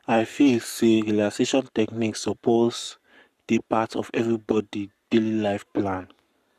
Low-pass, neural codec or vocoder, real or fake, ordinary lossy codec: 14.4 kHz; codec, 44.1 kHz, 7.8 kbps, Pupu-Codec; fake; Opus, 64 kbps